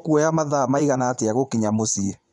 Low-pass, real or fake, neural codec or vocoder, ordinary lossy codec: 10.8 kHz; fake; vocoder, 24 kHz, 100 mel bands, Vocos; none